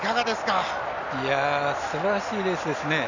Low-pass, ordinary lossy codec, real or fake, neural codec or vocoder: 7.2 kHz; none; real; none